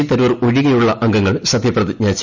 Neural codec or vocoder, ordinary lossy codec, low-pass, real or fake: none; none; 7.2 kHz; real